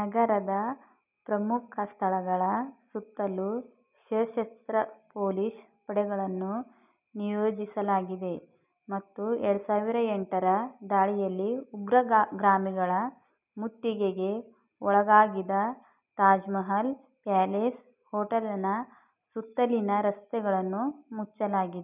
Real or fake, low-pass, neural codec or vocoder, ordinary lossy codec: real; 3.6 kHz; none; none